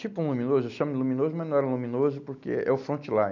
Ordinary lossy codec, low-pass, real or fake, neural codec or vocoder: none; 7.2 kHz; real; none